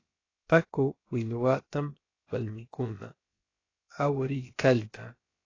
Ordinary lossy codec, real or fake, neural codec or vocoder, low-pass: AAC, 32 kbps; fake; codec, 16 kHz, about 1 kbps, DyCAST, with the encoder's durations; 7.2 kHz